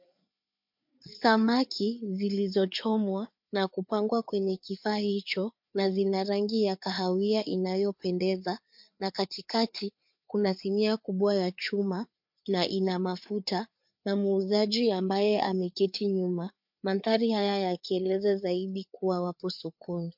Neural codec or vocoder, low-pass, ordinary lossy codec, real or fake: codec, 16 kHz, 4 kbps, FreqCodec, larger model; 5.4 kHz; MP3, 48 kbps; fake